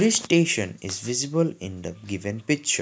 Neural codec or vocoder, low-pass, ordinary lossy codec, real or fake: none; none; none; real